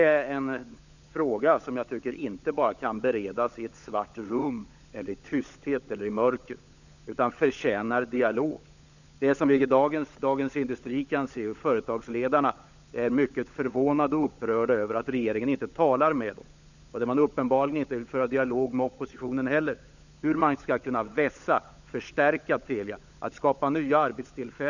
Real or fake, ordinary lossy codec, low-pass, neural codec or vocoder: fake; none; 7.2 kHz; codec, 16 kHz, 16 kbps, FunCodec, trained on LibriTTS, 50 frames a second